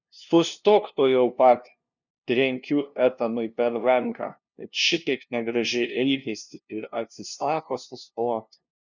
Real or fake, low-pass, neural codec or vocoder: fake; 7.2 kHz; codec, 16 kHz, 0.5 kbps, FunCodec, trained on LibriTTS, 25 frames a second